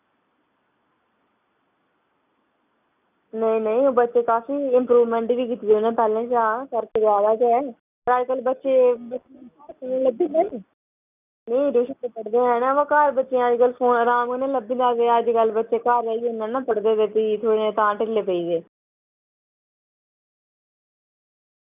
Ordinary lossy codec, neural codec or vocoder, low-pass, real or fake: none; none; 3.6 kHz; real